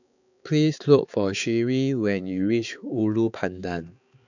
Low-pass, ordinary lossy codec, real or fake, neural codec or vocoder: 7.2 kHz; none; fake; codec, 16 kHz, 4 kbps, X-Codec, HuBERT features, trained on balanced general audio